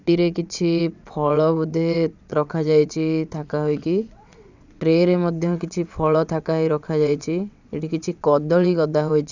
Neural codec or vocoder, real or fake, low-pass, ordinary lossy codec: vocoder, 22.05 kHz, 80 mel bands, WaveNeXt; fake; 7.2 kHz; none